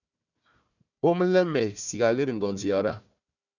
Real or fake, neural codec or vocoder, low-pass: fake; codec, 16 kHz, 1 kbps, FunCodec, trained on Chinese and English, 50 frames a second; 7.2 kHz